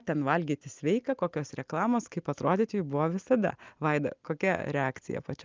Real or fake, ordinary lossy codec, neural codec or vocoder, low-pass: real; Opus, 32 kbps; none; 7.2 kHz